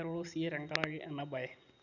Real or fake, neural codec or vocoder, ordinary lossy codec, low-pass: real; none; none; 7.2 kHz